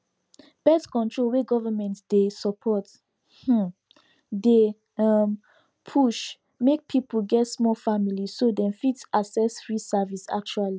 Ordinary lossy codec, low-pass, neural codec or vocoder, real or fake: none; none; none; real